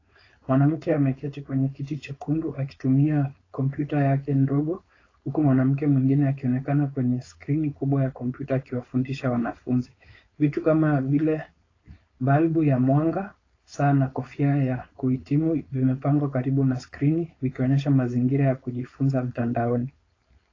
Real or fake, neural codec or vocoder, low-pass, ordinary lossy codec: fake; codec, 16 kHz, 4.8 kbps, FACodec; 7.2 kHz; AAC, 32 kbps